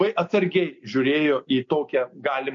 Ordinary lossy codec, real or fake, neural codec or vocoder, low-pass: MP3, 48 kbps; real; none; 7.2 kHz